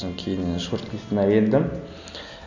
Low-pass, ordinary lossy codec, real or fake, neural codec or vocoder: 7.2 kHz; none; real; none